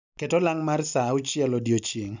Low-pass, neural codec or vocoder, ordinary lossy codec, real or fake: 7.2 kHz; none; none; real